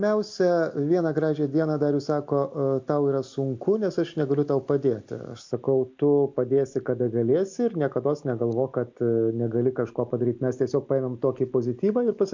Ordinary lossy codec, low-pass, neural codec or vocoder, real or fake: MP3, 64 kbps; 7.2 kHz; none; real